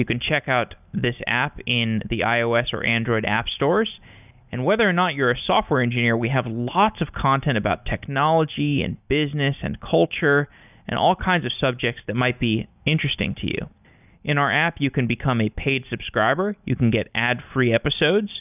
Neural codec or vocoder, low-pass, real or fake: none; 3.6 kHz; real